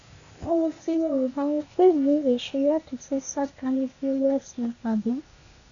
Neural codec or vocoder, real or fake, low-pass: codec, 16 kHz, 0.8 kbps, ZipCodec; fake; 7.2 kHz